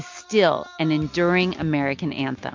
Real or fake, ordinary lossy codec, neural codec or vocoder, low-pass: real; MP3, 48 kbps; none; 7.2 kHz